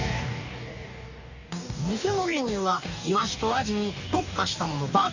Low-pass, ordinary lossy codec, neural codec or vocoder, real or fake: 7.2 kHz; none; codec, 44.1 kHz, 2.6 kbps, DAC; fake